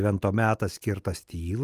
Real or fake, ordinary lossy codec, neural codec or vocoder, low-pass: real; Opus, 32 kbps; none; 14.4 kHz